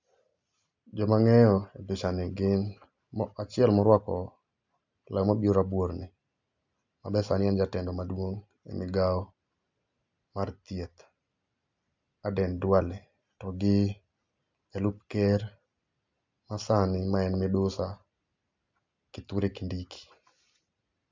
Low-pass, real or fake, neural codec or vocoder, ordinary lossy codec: 7.2 kHz; real; none; MP3, 64 kbps